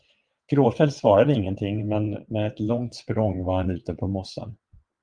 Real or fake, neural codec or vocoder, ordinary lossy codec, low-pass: fake; vocoder, 22.05 kHz, 80 mel bands, WaveNeXt; Opus, 32 kbps; 9.9 kHz